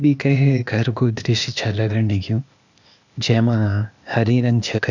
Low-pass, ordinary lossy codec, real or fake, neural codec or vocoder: 7.2 kHz; none; fake; codec, 16 kHz, 0.8 kbps, ZipCodec